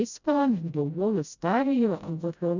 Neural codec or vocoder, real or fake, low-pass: codec, 16 kHz, 0.5 kbps, FreqCodec, smaller model; fake; 7.2 kHz